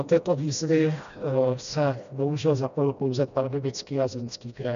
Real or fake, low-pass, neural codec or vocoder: fake; 7.2 kHz; codec, 16 kHz, 1 kbps, FreqCodec, smaller model